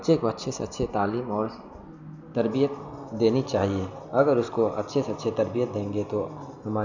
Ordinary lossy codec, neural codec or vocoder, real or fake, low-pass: none; none; real; 7.2 kHz